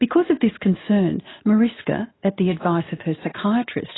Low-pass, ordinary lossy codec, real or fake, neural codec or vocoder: 7.2 kHz; AAC, 16 kbps; real; none